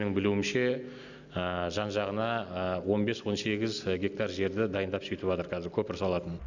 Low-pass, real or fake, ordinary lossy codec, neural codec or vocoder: 7.2 kHz; real; none; none